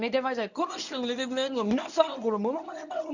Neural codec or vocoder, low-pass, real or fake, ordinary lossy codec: codec, 24 kHz, 0.9 kbps, WavTokenizer, medium speech release version 1; 7.2 kHz; fake; none